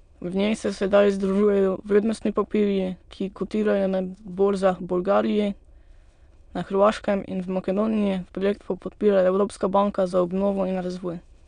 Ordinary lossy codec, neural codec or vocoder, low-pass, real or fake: none; autoencoder, 22.05 kHz, a latent of 192 numbers a frame, VITS, trained on many speakers; 9.9 kHz; fake